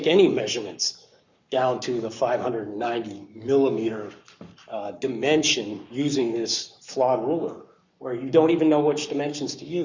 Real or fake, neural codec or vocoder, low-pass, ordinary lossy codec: fake; codec, 44.1 kHz, 7.8 kbps, Pupu-Codec; 7.2 kHz; Opus, 64 kbps